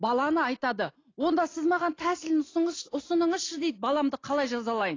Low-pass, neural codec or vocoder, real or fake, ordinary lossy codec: 7.2 kHz; none; real; AAC, 32 kbps